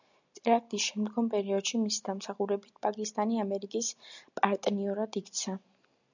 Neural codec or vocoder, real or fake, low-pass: none; real; 7.2 kHz